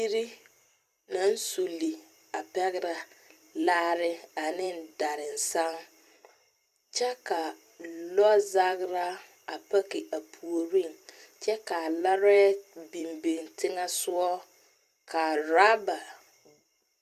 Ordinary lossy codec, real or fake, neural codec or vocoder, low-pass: Opus, 64 kbps; fake; vocoder, 44.1 kHz, 128 mel bands every 256 samples, BigVGAN v2; 14.4 kHz